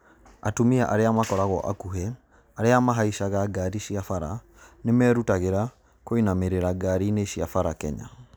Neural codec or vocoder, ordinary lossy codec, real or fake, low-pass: none; none; real; none